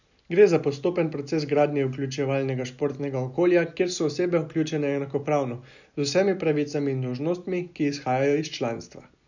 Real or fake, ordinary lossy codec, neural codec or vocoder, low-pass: real; none; none; 7.2 kHz